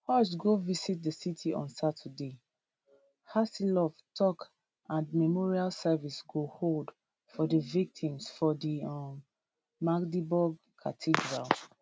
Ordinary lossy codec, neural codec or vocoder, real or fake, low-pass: none; none; real; none